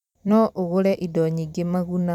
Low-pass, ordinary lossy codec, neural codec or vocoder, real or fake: 19.8 kHz; none; vocoder, 44.1 kHz, 128 mel bands every 256 samples, BigVGAN v2; fake